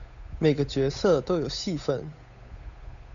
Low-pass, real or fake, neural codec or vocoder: 7.2 kHz; fake; codec, 16 kHz, 8 kbps, FunCodec, trained on Chinese and English, 25 frames a second